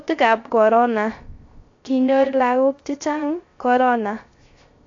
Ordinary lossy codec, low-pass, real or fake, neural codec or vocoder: AAC, 48 kbps; 7.2 kHz; fake; codec, 16 kHz, 0.3 kbps, FocalCodec